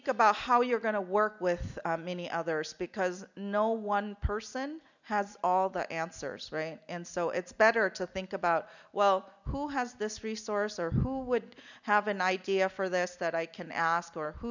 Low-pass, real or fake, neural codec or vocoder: 7.2 kHz; real; none